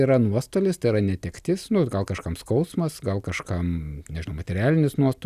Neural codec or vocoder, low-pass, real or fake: none; 14.4 kHz; real